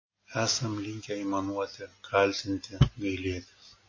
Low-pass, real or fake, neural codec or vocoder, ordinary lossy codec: 7.2 kHz; real; none; MP3, 32 kbps